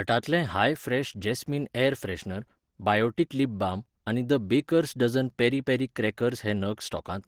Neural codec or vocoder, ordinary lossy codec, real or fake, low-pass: none; Opus, 16 kbps; real; 19.8 kHz